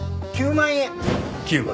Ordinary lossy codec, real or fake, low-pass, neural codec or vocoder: none; real; none; none